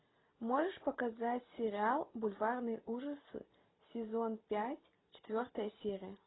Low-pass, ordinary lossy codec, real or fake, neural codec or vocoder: 7.2 kHz; AAC, 16 kbps; real; none